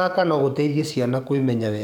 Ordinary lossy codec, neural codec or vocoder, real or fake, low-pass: none; codec, 44.1 kHz, 7.8 kbps, DAC; fake; 19.8 kHz